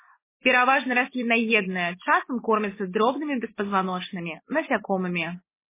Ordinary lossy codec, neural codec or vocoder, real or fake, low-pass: MP3, 16 kbps; none; real; 3.6 kHz